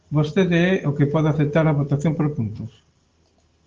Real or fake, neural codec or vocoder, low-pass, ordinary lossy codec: real; none; 7.2 kHz; Opus, 16 kbps